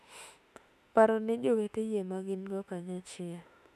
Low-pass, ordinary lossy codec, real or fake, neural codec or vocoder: 14.4 kHz; none; fake; autoencoder, 48 kHz, 32 numbers a frame, DAC-VAE, trained on Japanese speech